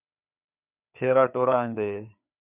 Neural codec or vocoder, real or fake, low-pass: codec, 16 kHz, 4 kbps, FreqCodec, larger model; fake; 3.6 kHz